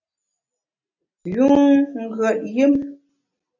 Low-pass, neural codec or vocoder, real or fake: 7.2 kHz; none; real